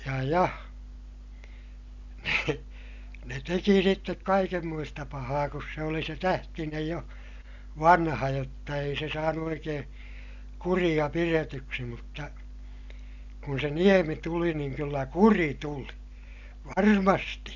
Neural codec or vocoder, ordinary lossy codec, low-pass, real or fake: none; none; 7.2 kHz; real